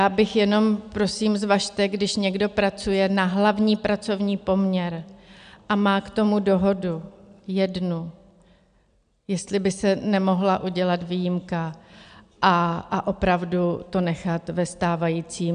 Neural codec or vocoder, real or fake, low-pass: none; real; 9.9 kHz